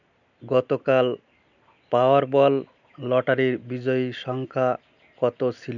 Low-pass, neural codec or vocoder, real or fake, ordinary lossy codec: 7.2 kHz; none; real; none